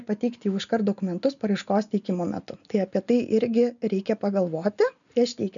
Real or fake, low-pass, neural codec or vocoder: real; 7.2 kHz; none